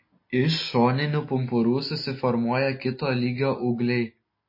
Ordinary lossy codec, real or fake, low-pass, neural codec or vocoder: MP3, 24 kbps; real; 5.4 kHz; none